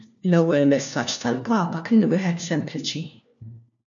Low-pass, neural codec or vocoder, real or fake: 7.2 kHz; codec, 16 kHz, 1 kbps, FunCodec, trained on LibriTTS, 50 frames a second; fake